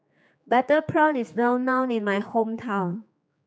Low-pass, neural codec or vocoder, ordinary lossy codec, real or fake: none; codec, 16 kHz, 4 kbps, X-Codec, HuBERT features, trained on general audio; none; fake